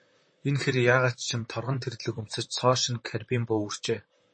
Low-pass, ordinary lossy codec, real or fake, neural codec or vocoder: 9.9 kHz; MP3, 32 kbps; fake; vocoder, 44.1 kHz, 128 mel bands, Pupu-Vocoder